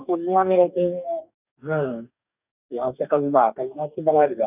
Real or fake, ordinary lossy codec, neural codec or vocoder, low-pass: fake; none; codec, 44.1 kHz, 2.6 kbps, DAC; 3.6 kHz